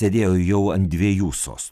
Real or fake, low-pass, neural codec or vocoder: real; 14.4 kHz; none